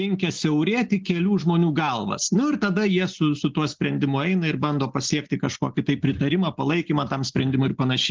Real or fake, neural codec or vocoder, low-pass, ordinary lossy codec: real; none; 7.2 kHz; Opus, 16 kbps